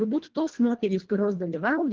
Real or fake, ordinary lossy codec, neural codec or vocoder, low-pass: fake; Opus, 16 kbps; codec, 24 kHz, 1.5 kbps, HILCodec; 7.2 kHz